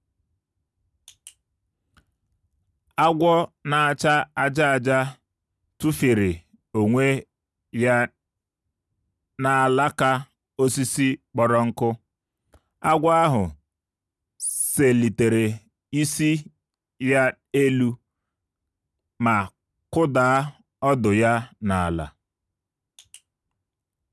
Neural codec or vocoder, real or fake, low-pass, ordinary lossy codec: none; real; none; none